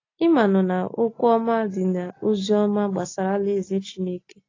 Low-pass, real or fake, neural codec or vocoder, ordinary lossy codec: 7.2 kHz; real; none; AAC, 32 kbps